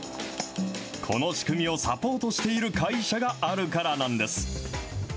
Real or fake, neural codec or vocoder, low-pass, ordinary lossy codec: real; none; none; none